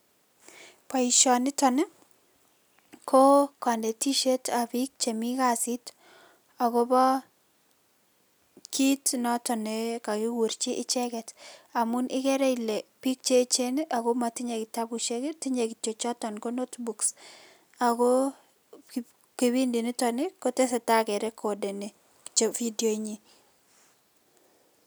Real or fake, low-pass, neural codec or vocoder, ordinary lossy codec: real; none; none; none